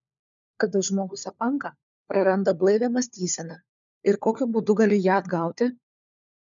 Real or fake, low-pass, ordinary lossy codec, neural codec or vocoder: fake; 7.2 kHz; MP3, 96 kbps; codec, 16 kHz, 4 kbps, FunCodec, trained on LibriTTS, 50 frames a second